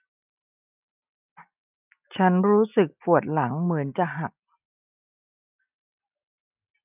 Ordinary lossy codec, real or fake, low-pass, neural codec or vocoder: none; real; 3.6 kHz; none